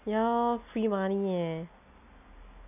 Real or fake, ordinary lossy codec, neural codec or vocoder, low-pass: real; none; none; 3.6 kHz